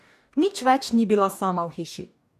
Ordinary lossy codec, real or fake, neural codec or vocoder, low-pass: none; fake; codec, 44.1 kHz, 2.6 kbps, DAC; 14.4 kHz